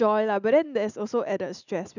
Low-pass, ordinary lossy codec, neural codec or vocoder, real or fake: 7.2 kHz; none; none; real